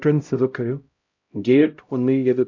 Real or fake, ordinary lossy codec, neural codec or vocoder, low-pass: fake; AAC, 48 kbps; codec, 16 kHz, 0.5 kbps, X-Codec, HuBERT features, trained on LibriSpeech; 7.2 kHz